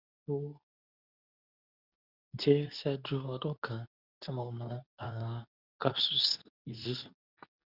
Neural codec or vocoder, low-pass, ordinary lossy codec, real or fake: codec, 24 kHz, 0.9 kbps, WavTokenizer, medium speech release version 2; 5.4 kHz; none; fake